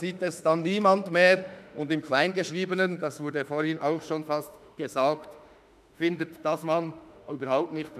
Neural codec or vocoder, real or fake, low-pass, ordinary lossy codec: autoencoder, 48 kHz, 32 numbers a frame, DAC-VAE, trained on Japanese speech; fake; 14.4 kHz; none